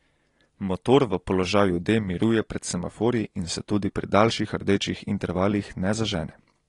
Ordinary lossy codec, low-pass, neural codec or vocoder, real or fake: AAC, 32 kbps; 10.8 kHz; none; real